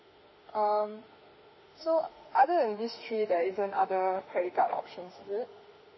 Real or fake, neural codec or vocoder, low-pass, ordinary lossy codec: fake; autoencoder, 48 kHz, 32 numbers a frame, DAC-VAE, trained on Japanese speech; 7.2 kHz; MP3, 24 kbps